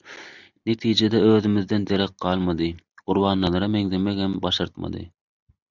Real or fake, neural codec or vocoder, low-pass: real; none; 7.2 kHz